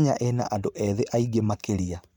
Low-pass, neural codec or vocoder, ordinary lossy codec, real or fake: 19.8 kHz; vocoder, 44.1 kHz, 128 mel bands every 512 samples, BigVGAN v2; none; fake